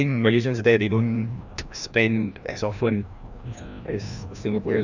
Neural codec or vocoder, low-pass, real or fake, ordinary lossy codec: codec, 16 kHz, 1 kbps, FreqCodec, larger model; 7.2 kHz; fake; none